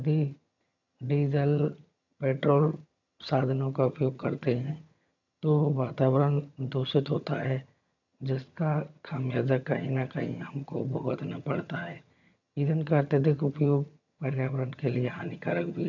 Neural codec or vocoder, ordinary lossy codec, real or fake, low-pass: vocoder, 22.05 kHz, 80 mel bands, HiFi-GAN; none; fake; 7.2 kHz